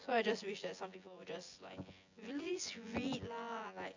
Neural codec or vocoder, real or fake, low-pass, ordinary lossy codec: vocoder, 24 kHz, 100 mel bands, Vocos; fake; 7.2 kHz; AAC, 48 kbps